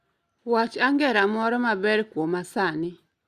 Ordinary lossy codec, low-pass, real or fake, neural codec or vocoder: Opus, 64 kbps; 14.4 kHz; real; none